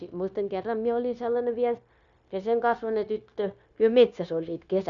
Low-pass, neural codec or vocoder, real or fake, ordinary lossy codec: 7.2 kHz; codec, 16 kHz, 0.9 kbps, LongCat-Audio-Codec; fake; none